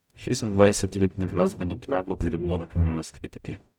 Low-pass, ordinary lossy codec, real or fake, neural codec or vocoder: 19.8 kHz; none; fake; codec, 44.1 kHz, 0.9 kbps, DAC